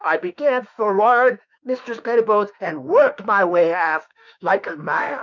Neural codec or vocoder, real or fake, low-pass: autoencoder, 48 kHz, 32 numbers a frame, DAC-VAE, trained on Japanese speech; fake; 7.2 kHz